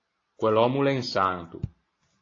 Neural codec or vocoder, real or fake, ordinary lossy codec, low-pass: none; real; AAC, 32 kbps; 7.2 kHz